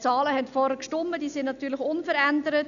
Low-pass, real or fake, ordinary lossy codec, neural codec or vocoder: 7.2 kHz; real; none; none